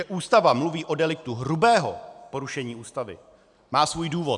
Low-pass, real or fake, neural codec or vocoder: 10.8 kHz; real; none